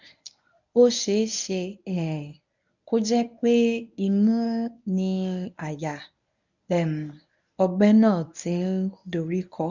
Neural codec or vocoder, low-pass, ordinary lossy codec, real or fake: codec, 24 kHz, 0.9 kbps, WavTokenizer, medium speech release version 1; 7.2 kHz; none; fake